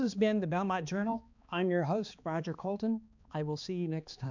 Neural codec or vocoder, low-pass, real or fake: codec, 16 kHz, 2 kbps, X-Codec, HuBERT features, trained on balanced general audio; 7.2 kHz; fake